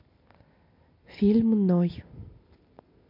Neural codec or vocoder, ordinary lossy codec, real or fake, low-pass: none; MP3, 32 kbps; real; 5.4 kHz